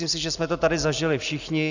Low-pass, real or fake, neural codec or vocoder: 7.2 kHz; real; none